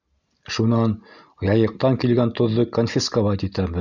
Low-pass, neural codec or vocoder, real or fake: 7.2 kHz; none; real